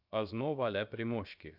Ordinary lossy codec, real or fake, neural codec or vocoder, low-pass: none; fake; codec, 16 kHz, about 1 kbps, DyCAST, with the encoder's durations; 5.4 kHz